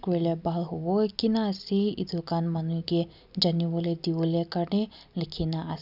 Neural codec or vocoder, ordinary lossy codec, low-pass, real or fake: none; none; 5.4 kHz; real